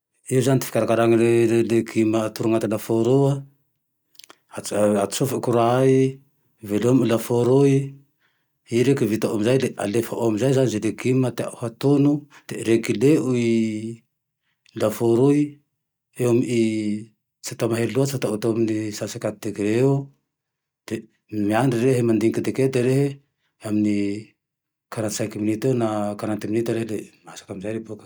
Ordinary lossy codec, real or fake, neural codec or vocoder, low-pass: none; real; none; none